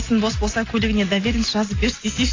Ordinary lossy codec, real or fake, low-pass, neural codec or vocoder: AAC, 32 kbps; real; 7.2 kHz; none